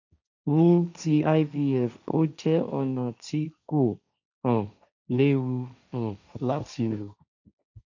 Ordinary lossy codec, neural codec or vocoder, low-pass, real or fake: none; codec, 16 kHz, 1.1 kbps, Voila-Tokenizer; 7.2 kHz; fake